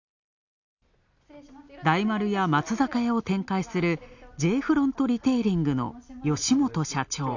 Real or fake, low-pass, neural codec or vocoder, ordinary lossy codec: real; 7.2 kHz; none; none